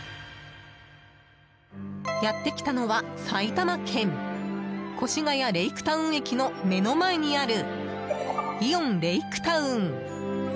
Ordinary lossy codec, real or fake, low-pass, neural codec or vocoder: none; real; none; none